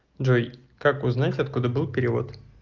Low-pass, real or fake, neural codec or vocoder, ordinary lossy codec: 7.2 kHz; real; none; Opus, 24 kbps